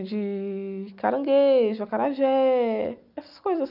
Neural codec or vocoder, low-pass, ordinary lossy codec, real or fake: none; 5.4 kHz; none; real